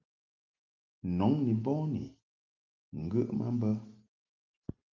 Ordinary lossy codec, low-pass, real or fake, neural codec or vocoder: Opus, 24 kbps; 7.2 kHz; real; none